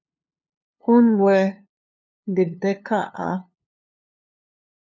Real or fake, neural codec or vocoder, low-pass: fake; codec, 16 kHz, 2 kbps, FunCodec, trained on LibriTTS, 25 frames a second; 7.2 kHz